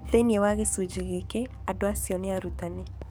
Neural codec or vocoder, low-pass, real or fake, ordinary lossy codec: codec, 44.1 kHz, 7.8 kbps, DAC; none; fake; none